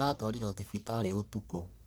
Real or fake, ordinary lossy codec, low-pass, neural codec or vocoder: fake; none; none; codec, 44.1 kHz, 1.7 kbps, Pupu-Codec